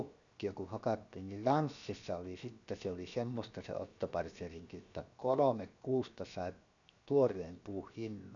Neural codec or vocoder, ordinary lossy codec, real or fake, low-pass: codec, 16 kHz, 0.7 kbps, FocalCodec; none; fake; 7.2 kHz